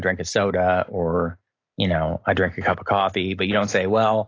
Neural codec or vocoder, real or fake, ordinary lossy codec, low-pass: none; real; AAC, 32 kbps; 7.2 kHz